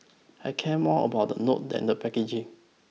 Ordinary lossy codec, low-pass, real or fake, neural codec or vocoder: none; none; real; none